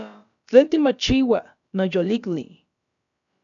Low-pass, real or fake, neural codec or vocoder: 7.2 kHz; fake; codec, 16 kHz, about 1 kbps, DyCAST, with the encoder's durations